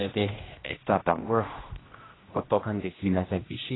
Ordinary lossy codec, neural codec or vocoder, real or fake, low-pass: AAC, 16 kbps; codec, 16 kHz, 1 kbps, X-Codec, HuBERT features, trained on general audio; fake; 7.2 kHz